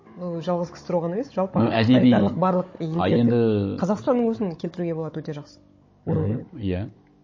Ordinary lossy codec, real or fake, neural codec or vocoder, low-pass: MP3, 32 kbps; fake; codec, 16 kHz, 16 kbps, FunCodec, trained on Chinese and English, 50 frames a second; 7.2 kHz